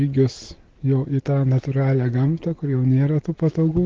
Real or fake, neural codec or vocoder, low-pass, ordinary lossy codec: real; none; 7.2 kHz; Opus, 16 kbps